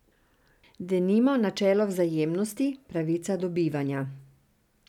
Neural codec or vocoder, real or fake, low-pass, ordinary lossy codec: none; real; 19.8 kHz; none